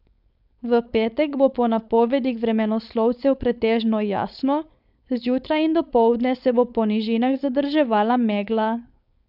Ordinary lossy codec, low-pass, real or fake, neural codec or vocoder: none; 5.4 kHz; fake; codec, 16 kHz, 4.8 kbps, FACodec